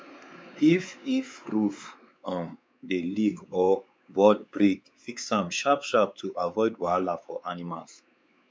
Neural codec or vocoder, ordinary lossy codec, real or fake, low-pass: codec, 16 kHz, 4 kbps, X-Codec, WavLM features, trained on Multilingual LibriSpeech; none; fake; none